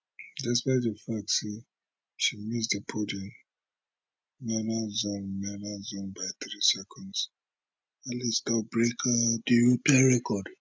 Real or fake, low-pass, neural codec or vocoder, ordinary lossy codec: real; none; none; none